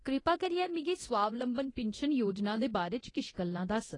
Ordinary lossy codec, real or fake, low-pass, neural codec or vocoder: AAC, 32 kbps; fake; 10.8 kHz; codec, 24 kHz, 0.9 kbps, DualCodec